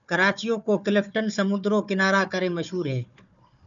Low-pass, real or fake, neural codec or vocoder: 7.2 kHz; fake; codec, 16 kHz, 4 kbps, FunCodec, trained on Chinese and English, 50 frames a second